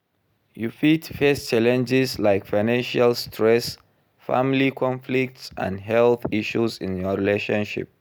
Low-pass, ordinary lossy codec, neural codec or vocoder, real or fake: none; none; none; real